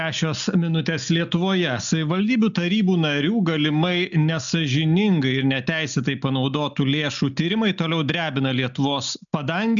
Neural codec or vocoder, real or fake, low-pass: none; real; 7.2 kHz